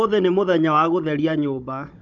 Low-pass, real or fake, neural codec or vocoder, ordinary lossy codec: 7.2 kHz; fake; codec, 16 kHz, 16 kbps, FunCodec, trained on Chinese and English, 50 frames a second; none